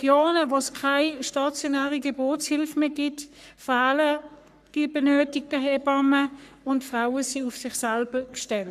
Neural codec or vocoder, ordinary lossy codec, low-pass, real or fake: codec, 44.1 kHz, 3.4 kbps, Pupu-Codec; none; 14.4 kHz; fake